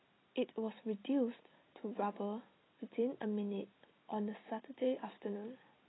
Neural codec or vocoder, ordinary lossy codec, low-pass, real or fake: none; AAC, 16 kbps; 7.2 kHz; real